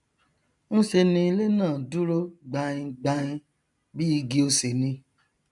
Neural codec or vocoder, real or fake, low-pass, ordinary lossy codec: none; real; 10.8 kHz; MP3, 96 kbps